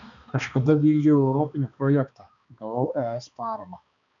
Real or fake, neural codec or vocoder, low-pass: fake; codec, 16 kHz, 2 kbps, X-Codec, HuBERT features, trained on balanced general audio; 7.2 kHz